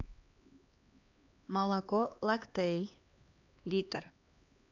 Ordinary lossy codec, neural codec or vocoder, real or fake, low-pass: AAC, 48 kbps; codec, 16 kHz, 2 kbps, X-Codec, HuBERT features, trained on LibriSpeech; fake; 7.2 kHz